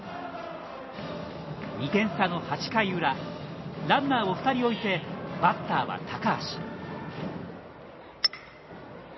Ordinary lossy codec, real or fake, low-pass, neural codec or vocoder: MP3, 24 kbps; real; 7.2 kHz; none